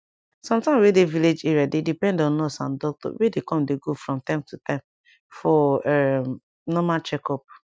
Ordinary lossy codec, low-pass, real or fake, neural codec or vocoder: none; none; real; none